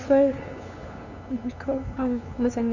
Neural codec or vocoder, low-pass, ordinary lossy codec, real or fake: codec, 16 kHz, 2 kbps, FunCodec, trained on LibriTTS, 25 frames a second; 7.2 kHz; none; fake